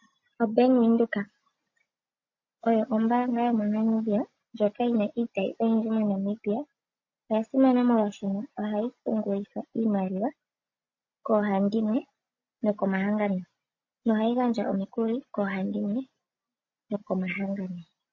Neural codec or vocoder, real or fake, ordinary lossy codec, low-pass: none; real; MP3, 32 kbps; 7.2 kHz